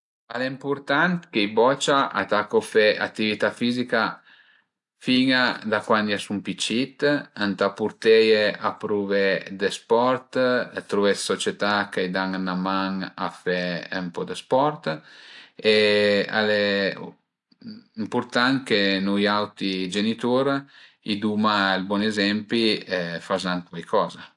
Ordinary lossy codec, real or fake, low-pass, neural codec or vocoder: AAC, 64 kbps; real; 10.8 kHz; none